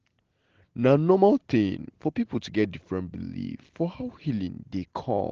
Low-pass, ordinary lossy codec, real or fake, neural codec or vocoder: 7.2 kHz; Opus, 16 kbps; real; none